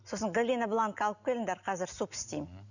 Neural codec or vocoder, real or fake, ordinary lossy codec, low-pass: none; real; none; 7.2 kHz